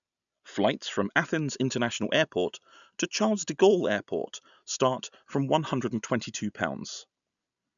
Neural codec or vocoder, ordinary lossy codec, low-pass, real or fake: none; none; 7.2 kHz; real